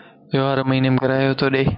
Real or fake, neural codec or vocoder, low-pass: real; none; 5.4 kHz